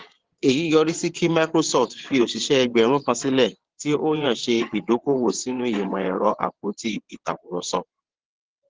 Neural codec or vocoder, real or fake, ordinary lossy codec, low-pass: vocoder, 22.05 kHz, 80 mel bands, WaveNeXt; fake; Opus, 16 kbps; 7.2 kHz